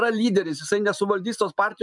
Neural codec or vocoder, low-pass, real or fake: none; 10.8 kHz; real